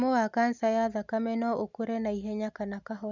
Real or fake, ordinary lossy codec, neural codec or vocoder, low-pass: real; none; none; 7.2 kHz